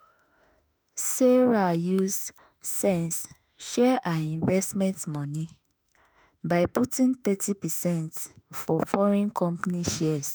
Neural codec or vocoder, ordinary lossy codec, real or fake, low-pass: autoencoder, 48 kHz, 32 numbers a frame, DAC-VAE, trained on Japanese speech; none; fake; none